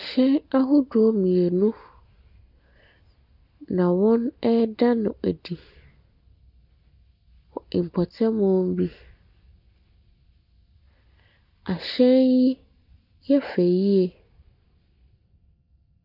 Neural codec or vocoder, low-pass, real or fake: none; 5.4 kHz; real